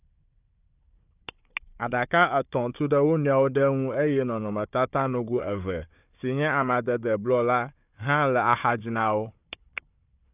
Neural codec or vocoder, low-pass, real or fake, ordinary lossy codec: codec, 16 kHz, 4 kbps, FunCodec, trained on Chinese and English, 50 frames a second; 3.6 kHz; fake; none